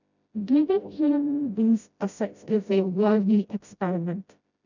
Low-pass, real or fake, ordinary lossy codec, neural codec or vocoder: 7.2 kHz; fake; none; codec, 16 kHz, 0.5 kbps, FreqCodec, smaller model